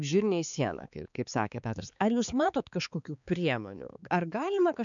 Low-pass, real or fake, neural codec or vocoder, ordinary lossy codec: 7.2 kHz; fake; codec, 16 kHz, 4 kbps, X-Codec, HuBERT features, trained on balanced general audio; MP3, 96 kbps